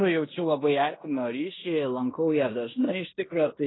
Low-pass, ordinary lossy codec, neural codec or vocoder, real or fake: 7.2 kHz; AAC, 16 kbps; codec, 16 kHz in and 24 kHz out, 0.9 kbps, LongCat-Audio-Codec, fine tuned four codebook decoder; fake